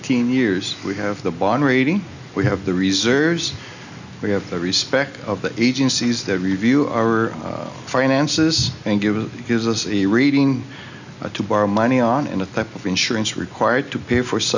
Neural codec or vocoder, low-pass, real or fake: none; 7.2 kHz; real